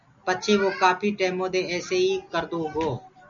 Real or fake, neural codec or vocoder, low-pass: real; none; 7.2 kHz